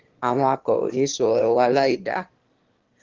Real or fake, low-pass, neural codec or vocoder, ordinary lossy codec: fake; 7.2 kHz; autoencoder, 22.05 kHz, a latent of 192 numbers a frame, VITS, trained on one speaker; Opus, 16 kbps